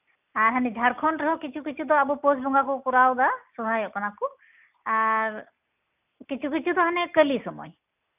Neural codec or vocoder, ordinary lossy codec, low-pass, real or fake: none; none; 3.6 kHz; real